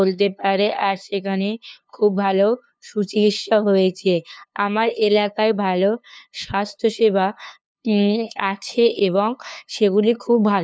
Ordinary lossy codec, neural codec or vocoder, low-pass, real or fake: none; codec, 16 kHz, 2 kbps, FunCodec, trained on LibriTTS, 25 frames a second; none; fake